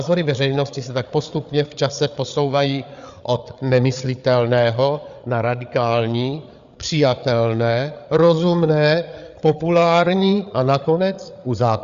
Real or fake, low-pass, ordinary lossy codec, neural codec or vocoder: fake; 7.2 kHz; Opus, 64 kbps; codec, 16 kHz, 8 kbps, FreqCodec, larger model